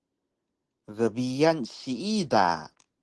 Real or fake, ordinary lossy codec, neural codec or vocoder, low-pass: real; Opus, 16 kbps; none; 10.8 kHz